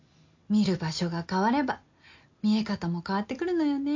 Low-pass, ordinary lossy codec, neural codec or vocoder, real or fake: 7.2 kHz; MP3, 48 kbps; none; real